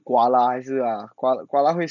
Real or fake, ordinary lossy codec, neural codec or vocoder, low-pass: real; none; none; 7.2 kHz